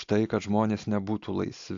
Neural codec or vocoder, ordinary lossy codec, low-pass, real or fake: none; AAC, 64 kbps; 7.2 kHz; real